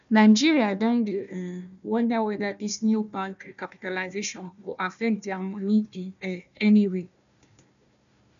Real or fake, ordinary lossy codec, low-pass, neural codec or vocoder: fake; none; 7.2 kHz; codec, 16 kHz, 1 kbps, FunCodec, trained on Chinese and English, 50 frames a second